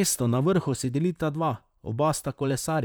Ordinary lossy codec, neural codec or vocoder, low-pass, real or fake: none; vocoder, 44.1 kHz, 128 mel bands, Pupu-Vocoder; none; fake